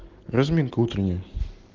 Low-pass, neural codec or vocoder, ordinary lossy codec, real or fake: 7.2 kHz; none; Opus, 16 kbps; real